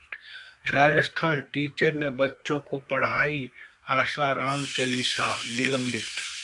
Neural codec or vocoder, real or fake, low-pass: codec, 24 kHz, 1 kbps, SNAC; fake; 10.8 kHz